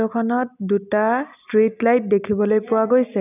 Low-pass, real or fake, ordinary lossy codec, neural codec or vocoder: 3.6 kHz; real; none; none